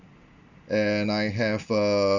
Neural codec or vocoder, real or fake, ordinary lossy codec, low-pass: none; real; Opus, 64 kbps; 7.2 kHz